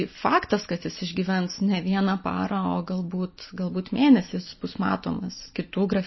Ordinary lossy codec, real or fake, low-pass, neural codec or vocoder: MP3, 24 kbps; real; 7.2 kHz; none